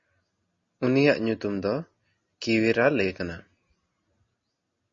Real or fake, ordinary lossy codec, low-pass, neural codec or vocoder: real; MP3, 32 kbps; 7.2 kHz; none